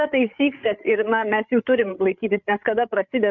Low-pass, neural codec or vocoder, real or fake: 7.2 kHz; codec, 16 kHz, 8 kbps, FreqCodec, larger model; fake